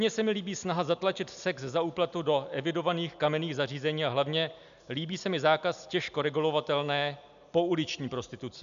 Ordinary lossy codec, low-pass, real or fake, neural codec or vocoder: MP3, 96 kbps; 7.2 kHz; real; none